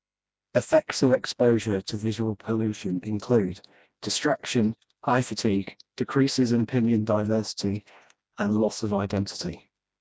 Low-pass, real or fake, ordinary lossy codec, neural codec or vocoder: none; fake; none; codec, 16 kHz, 1 kbps, FreqCodec, smaller model